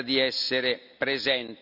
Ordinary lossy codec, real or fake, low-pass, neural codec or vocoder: none; real; 5.4 kHz; none